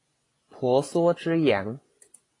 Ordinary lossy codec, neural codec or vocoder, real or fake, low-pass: AAC, 32 kbps; vocoder, 24 kHz, 100 mel bands, Vocos; fake; 10.8 kHz